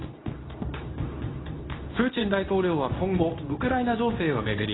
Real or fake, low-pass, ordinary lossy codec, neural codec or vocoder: fake; 7.2 kHz; AAC, 16 kbps; codec, 24 kHz, 0.9 kbps, WavTokenizer, medium speech release version 2